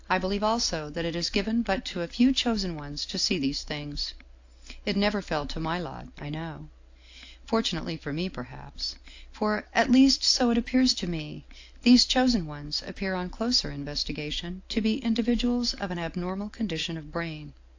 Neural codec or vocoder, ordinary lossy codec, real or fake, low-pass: none; AAC, 48 kbps; real; 7.2 kHz